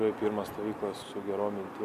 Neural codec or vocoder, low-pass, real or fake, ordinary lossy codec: none; 14.4 kHz; real; MP3, 96 kbps